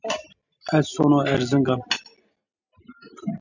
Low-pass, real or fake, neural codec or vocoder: 7.2 kHz; real; none